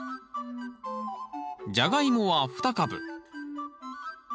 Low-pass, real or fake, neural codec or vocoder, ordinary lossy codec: none; real; none; none